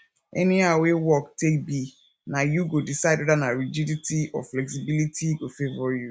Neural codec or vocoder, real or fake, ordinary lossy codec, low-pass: none; real; none; none